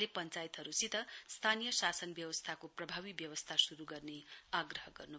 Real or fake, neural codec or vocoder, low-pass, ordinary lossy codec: real; none; none; none